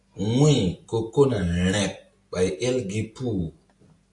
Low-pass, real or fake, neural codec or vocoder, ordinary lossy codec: 10.8 kHz; real; none; AAC, 64 kbps